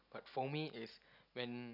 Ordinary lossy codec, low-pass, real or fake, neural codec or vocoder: none; 5.4 kHz; real; none